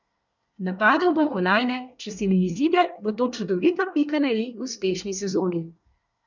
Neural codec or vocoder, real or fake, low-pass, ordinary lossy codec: codec, 24 kHz, 1 kbps, SNAC; fake; 7.2 kHz; none